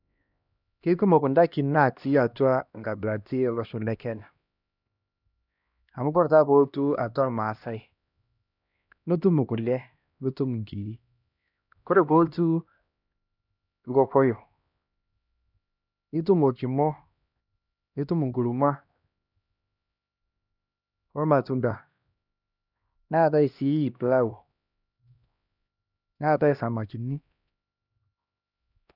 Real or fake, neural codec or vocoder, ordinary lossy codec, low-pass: fake; codec, 16 kHz, 1 kbps, X-Codec, HuBERT features, trained on LibriSpeech; none; 5.4 kHz